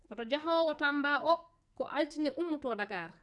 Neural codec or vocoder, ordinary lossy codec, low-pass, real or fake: codec, 44.1 kHz, 2.6 kbps, SNAC; none; 10.8 kHz; fake